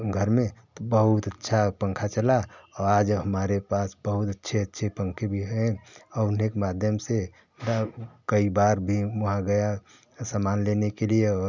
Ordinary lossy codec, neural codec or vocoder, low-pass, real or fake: none; none; 7.2 kHz; real